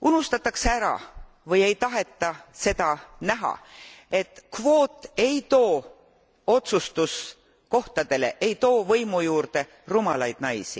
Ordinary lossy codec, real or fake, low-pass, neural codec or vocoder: none; real; none; none